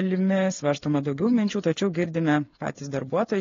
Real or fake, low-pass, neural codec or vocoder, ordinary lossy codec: fake; 7.2 kHz; codec, 16 kHz, 8 kbps, FreqCodec, smaller model; AAC, 32 kbps